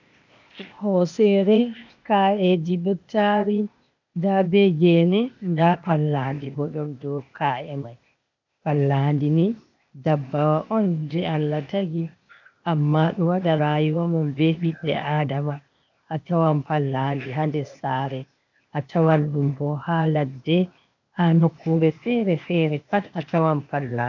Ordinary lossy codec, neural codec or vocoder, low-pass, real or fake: MP3, 64 kbps; codec, 16 kHz, 0.8 kbps, ZipCodec; 7.2 kHz; fake